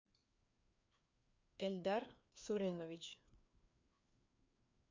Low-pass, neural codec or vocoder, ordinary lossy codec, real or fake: 7.2 kHz; codec, 16 kHz, 2 kbps, FreqCodec, larger model; MP3, 64 kbps; fake